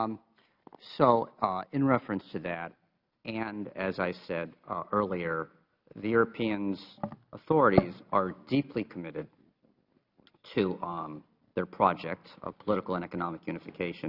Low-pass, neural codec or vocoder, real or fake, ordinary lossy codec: 5.4 kHz; none; real; Opus, 64 kbps